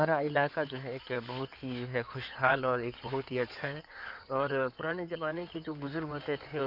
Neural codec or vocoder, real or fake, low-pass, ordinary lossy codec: codec, 16 kHz in and 24 kHz out, 2.2 kbps, FireRedTTS-2 codec; fake; 5.4 kHz; none